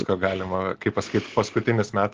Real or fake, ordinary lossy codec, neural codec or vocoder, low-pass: real; Opus, 16 kbps; none; 7.2 kHz